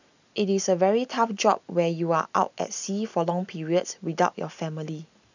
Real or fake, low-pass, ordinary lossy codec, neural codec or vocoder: real; 7.2 kHz; none; none